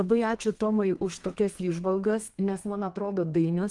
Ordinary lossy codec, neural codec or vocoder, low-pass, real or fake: Opus, 24 kbps; codec, 32 kHz, 1.9 kbps, SNAC; 10.8 kHz; fake